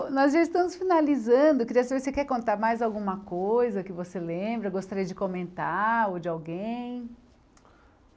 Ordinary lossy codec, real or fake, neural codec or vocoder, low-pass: none; real; none; none